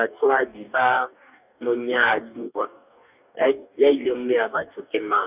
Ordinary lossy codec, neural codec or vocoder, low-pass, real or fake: none; codec, 44.1 kHz, 2.6 kbps, DAC; 3.6 kHz; fake